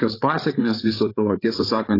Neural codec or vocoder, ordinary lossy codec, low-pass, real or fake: codec, 16 kHz, 8 kbps, FreqCodec, larger model; AAC, 24 kbps; 5.4 kHz; fake